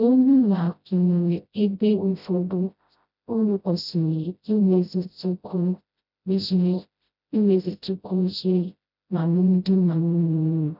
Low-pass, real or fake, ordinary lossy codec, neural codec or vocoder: 5.4 kHz; fake; none; codec, 16 kHz, 0.5 kbps, FreqCodec, smaller model